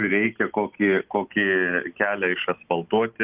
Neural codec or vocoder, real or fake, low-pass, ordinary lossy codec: none; real; 3.6 kHz; Opus, 32 kbps